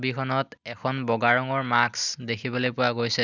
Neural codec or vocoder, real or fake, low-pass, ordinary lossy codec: none; real; 7.2 kHz; none